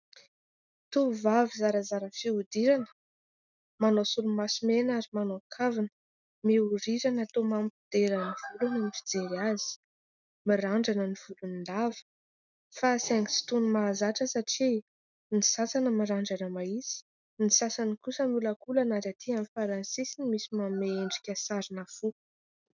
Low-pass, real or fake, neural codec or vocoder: 7.2 kHz; real; none